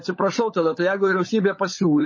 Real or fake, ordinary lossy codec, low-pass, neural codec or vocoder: fake; MP3, 32 kbps; 7.2 kHz; codec, 16 kHz, 16 kbps, FunCodec, trained on LibriTTS, 50 frames a second